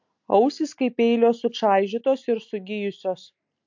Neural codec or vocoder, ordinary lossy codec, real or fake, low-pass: none; MP3, 48 kbps; real; 7.2 kHz